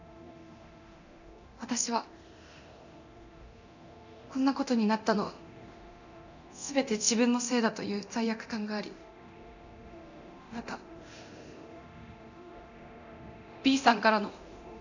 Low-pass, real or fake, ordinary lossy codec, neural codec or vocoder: 7.2 kHz; fake; none; codec, 24 kHz, 0.9 kbps, DualCodec